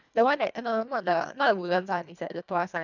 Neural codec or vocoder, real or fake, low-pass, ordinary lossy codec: codec, 24 kHz, 1.5 kbps, HILCodec; fake; 7.2 kHz; none